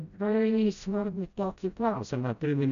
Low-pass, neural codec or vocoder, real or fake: 7.2 kHz; codec, 16 kHz, 0.5 kbps, FreqCodec, smaller model; fake